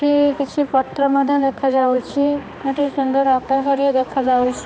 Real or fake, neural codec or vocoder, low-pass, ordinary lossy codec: fake; codec, 16 kHz, 2 kbps, X-Codec, HuBERT features, trained on balanced general audio; none; none